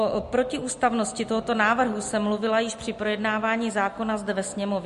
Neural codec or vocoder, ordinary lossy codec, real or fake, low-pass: none; MP3, 48 kbps; real; 14.4 kHz